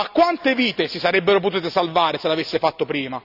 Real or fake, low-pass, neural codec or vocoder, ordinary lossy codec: real; 5.4 kHz; none; none